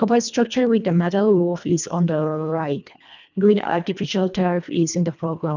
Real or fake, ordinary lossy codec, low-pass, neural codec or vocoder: fake; none; 7.2 kHz; codec, 24 kHz, 1.5 kbps, HILCodec